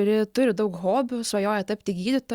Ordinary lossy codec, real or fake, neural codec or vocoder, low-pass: Opus, 64 kbps; real; none; 19.8 kHz